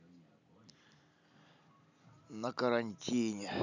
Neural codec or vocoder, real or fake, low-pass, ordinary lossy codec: none; real; 7.2 kHz; none